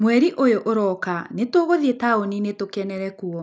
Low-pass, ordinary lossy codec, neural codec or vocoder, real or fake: none; none; none; real